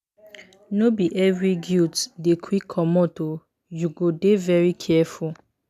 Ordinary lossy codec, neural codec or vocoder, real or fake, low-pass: none; none; real; 14.4 kHz